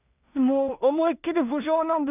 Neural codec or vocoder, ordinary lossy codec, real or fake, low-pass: codec, 16 kHz in and 24 kHz out, 0.4 kbps, LongCat-Audio-Codec, two codebook decoder; none; fake; 3.6 kHz